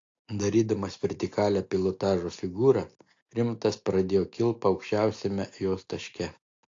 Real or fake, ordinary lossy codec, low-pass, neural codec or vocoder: real; AAC, 48 kbps; 7.2 kHz; none